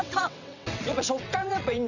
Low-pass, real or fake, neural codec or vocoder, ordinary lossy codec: 7.2 kHz; fake; vocoder, 22.05 kHz, 80 mel bands, WaveNeXt; none